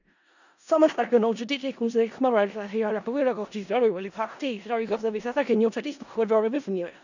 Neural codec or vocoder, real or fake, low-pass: codec, 16 kHz in and 24 kHz out, 0.4 kbps, LongCat-Audio-Codec, four codebook decoder; fake; 7.2 kHz